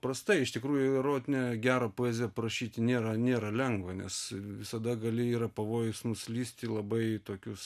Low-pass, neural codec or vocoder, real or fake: 14.4 kHz; none; real